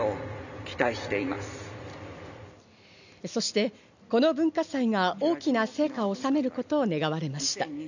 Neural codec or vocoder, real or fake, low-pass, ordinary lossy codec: none; real; 7.2 kHz; none